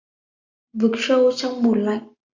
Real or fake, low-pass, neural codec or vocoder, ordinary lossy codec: real; 7.2 kHz; none; AAC, 48 kbps